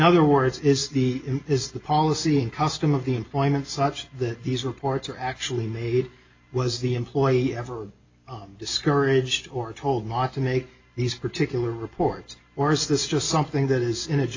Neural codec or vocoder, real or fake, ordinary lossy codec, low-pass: none; real; AAC, 48 kbps; 7.2 kHz